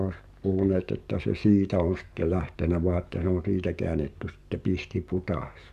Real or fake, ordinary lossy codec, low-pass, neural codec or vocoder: fake; Opus, 64 kbps; 14.4 kHz; codec, 44.1 kHz, 7.8 kbps, DAC